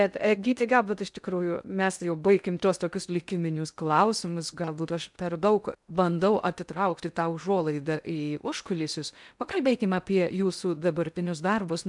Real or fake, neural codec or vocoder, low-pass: fake; codec, 16 kHz in and 24 kHz out, 0.6 kbps, FocalCodec, streaming, 2048 codes; 10.8 kHz